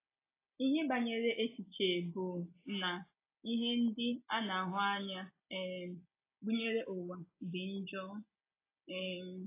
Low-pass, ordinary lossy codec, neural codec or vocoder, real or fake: 3.6 kHz; AAC, 24 kbps; none; real